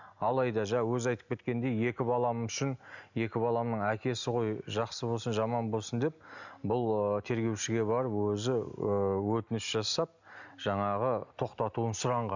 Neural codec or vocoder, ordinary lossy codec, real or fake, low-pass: none; none; real; 7.2 kHz